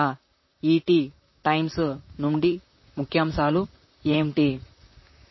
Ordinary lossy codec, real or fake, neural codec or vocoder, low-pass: MP3, 24 kbps; fake; vocoder, 44.1 kHz, 128 mel bands, Pupu-Vocoder; 7.2 kHz